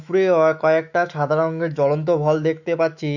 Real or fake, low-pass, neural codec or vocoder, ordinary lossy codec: fake; 7.2 kHz; autoencoder, 48 kHz, 128 numbers a frame, DAC-VAE, trained on Japanese speech; none